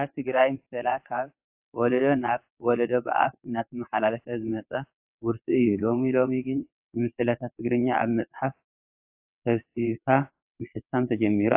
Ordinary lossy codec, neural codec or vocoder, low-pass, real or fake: AAC, 32 kbps; vocoder, 22.05 kHz, 80 mel bands, WaveNeXt; 3.6 kHz; fake